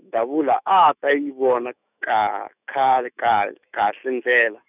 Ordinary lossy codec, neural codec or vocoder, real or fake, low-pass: none; autoencoder, 48 kHz, 128 numbers a frame, DAC-VAE, trained on Japanese speech; fake; 3.6 kHz